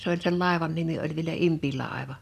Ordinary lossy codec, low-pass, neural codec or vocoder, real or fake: none; 14.4 kHz; none; real